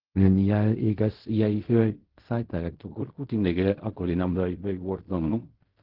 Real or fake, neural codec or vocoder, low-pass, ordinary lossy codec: fake; codec, 16 kHz in and 24 kHz out, 0.4 kbps, LongCat-Audio-Codec, fine tuned four codebook decoder; 5.4 kHz; Opus, 16 kbps